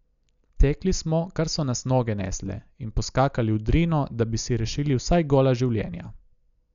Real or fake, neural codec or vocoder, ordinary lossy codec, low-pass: real; none; none; 7.2 kHz